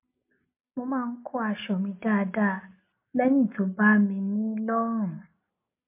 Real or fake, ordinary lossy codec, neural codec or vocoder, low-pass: real; MP3, 24 kbps; none; 3.6 kHz